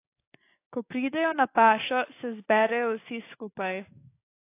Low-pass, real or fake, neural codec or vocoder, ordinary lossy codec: 3.6 kHz; fake; codec, 16 kHz, 6 kbps, DAC; AAC, 24 kbps